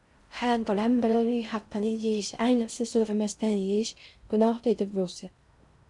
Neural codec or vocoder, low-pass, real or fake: codec, 16 kHz in and 24 kHz out, 0.6 kbps, FocalCodec, streaming, 4096 codes; 10.8 kHz; fake